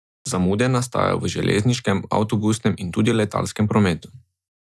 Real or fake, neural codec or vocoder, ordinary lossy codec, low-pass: real; none; none; none